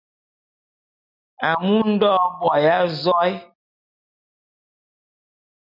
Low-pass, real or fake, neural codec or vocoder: 5.4 kHz; real; none